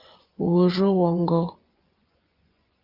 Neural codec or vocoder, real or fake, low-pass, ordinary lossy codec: vocoder, 24 kHz, 100 mel bands, Vocos; fake; 5.4 kHz; Opus, 32 kbps